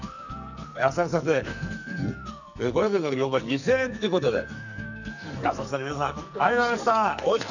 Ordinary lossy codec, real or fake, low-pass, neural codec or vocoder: none; fake; 7.2 kHz; codec, 44.1 kHz, 2.6 kbps, SNAC